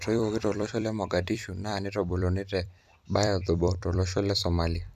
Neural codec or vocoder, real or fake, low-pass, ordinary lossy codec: vocoder, 44.1 kHz, 128 mel bands every 256 samples, BigVGAN v2; fake; 14.4 kHz; none